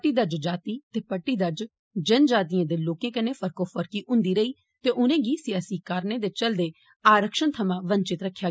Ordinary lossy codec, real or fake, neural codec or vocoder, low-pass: none; real; none; none